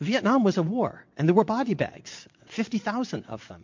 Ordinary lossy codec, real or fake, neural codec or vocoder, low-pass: MP3, 48 kbps; fake; vocoder, 22.05 kHz, 80 mel bands, WaveNeXt; 7.2 kHz